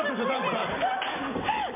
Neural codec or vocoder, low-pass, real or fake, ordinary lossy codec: none; 3.6 kHz; real; MP3, 24 kbps